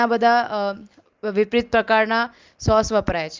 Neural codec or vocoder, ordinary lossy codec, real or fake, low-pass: none; Opus, 32 kbps; real; 7.2 kHz